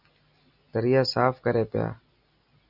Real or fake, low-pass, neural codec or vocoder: real; 5.4 kHz; none